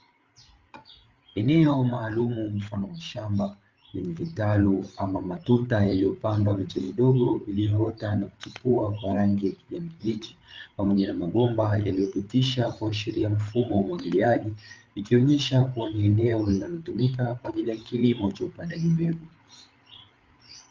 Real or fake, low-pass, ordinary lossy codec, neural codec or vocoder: fake; 7.2 kHz; Opus, 32 kbps; codec, 16 kHz, 8 kbps, FreqCodec, larger model